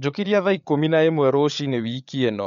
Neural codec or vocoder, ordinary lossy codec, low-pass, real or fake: codec, 16 kHz, 8 kbps, FreqCodec, larger model; none; 7.2 kHz; fake